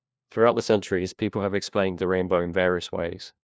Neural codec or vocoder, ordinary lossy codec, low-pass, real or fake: codec, 16 kHz, 1 kbps, FunCodec, trained on LibriTTS, 50 frames a second; none; none; fake